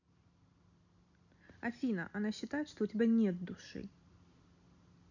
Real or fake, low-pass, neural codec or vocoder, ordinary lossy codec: real; 7.2 kHz; none; none